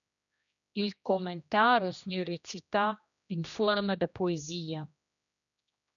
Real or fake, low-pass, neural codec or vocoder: fake; 7.2 kHz; codec, 16 kHz, 1 kbps, X-Codec, HuBERT features, trained on general audio